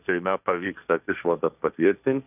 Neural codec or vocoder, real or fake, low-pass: codec, 16 kHz, 1.1 kbps, Voila-Tokenizer; fake; 3.6 kHz